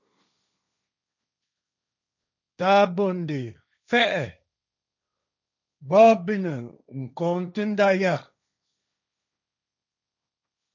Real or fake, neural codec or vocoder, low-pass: fake; codec, 16 kHz, 1.1 kbps, Voila-Tokenizer; 7.2 kHz